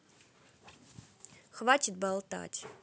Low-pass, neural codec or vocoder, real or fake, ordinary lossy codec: none; none; real; none